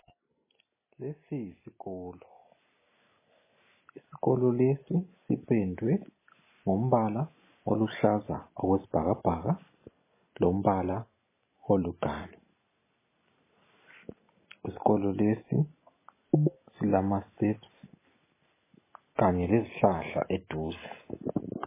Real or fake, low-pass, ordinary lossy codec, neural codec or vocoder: real; 3.6 kHz; MP3, 16 kbps; none